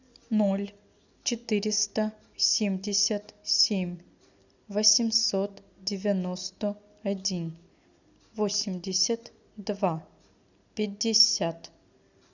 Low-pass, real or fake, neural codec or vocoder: 7.2 kHz; real; none